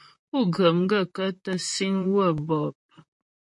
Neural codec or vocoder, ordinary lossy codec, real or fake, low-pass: vocoder, 44.1 kHz, 128 mel bands, Pupu-Vocoder; MP3, 48 kbps; fake; 10.8 kHz